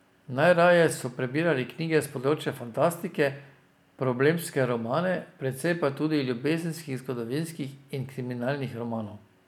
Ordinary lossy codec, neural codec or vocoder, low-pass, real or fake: none; none; 19.8 kHz; real